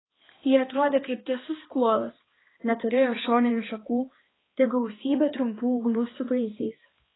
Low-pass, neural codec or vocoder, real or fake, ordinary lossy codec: 7.2 kHz; codec, 16 kHz, 2 kbps, X-Codec, HuBERT features, trained on balanced general audio; fake; AAC, 16 kbps